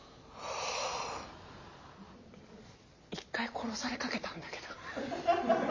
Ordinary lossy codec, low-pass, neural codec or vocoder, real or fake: MP3, 32 kbps; 7.2 kHz; none; real